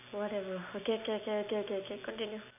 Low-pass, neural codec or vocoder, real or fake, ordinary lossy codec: 3.6 kHz; none; real; none